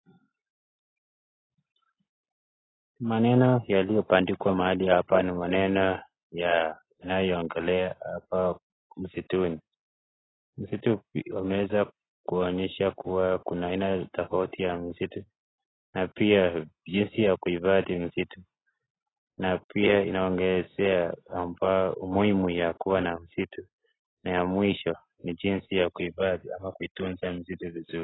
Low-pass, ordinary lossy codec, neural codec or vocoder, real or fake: 7.2 kHz; AAC, 16 kbps; none; real